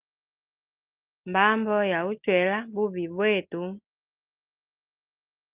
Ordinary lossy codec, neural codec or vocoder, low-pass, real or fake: Opus, 24 kbps; none; 3.6 kHz; real